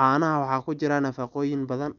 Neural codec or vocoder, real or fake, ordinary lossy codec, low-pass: none; real; none; 7.2 kHz